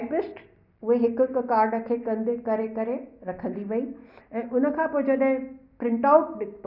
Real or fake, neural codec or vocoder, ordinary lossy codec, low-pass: real; none; none; 5.4 kHz